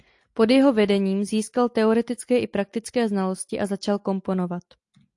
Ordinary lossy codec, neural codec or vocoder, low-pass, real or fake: AAC, 64 kbps; none; 10.8 kHz; real